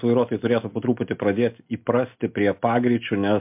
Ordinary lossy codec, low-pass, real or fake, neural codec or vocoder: MP3, 32 kbps; 3.6 kHz; real; none